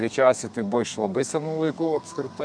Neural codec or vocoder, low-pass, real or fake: codec, 32 kHz, 1.9 kbps, SNAC; 9.9 kHz; fake